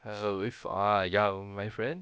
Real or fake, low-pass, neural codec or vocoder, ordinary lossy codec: fake; none; codec, 16 kHz, about 1 kbps, DyCAST, with the encoder's durations; none